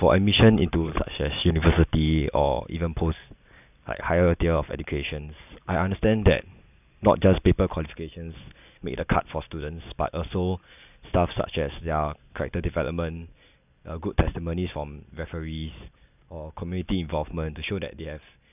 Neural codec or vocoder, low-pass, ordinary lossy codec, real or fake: none; 3.6 kHz; none; real